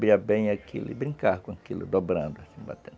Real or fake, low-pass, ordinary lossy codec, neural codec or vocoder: real; none; none; none